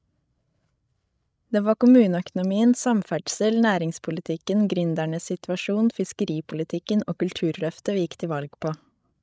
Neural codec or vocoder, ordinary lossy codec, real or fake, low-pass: codec, 16 kHz, 16 kbps, FreqCodec, larger model; none; fake; none